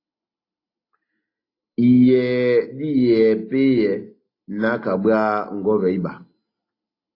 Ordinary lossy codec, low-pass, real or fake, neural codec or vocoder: AAC, 32 kbps; 5.4 kHz; real; none